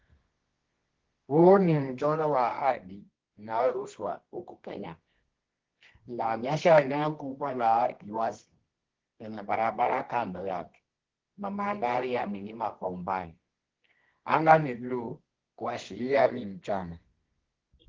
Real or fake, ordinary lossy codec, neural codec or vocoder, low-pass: fake; Opus, 16 kbps; codec, 24 kHz, 0.9 kbps, WavTokenizer, medium music audio release; 7.2 kHz